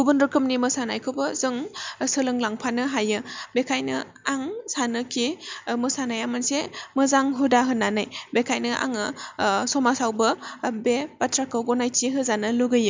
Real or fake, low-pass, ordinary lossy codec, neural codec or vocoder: real; 7.2 kHz; MP3, 64 kbps; none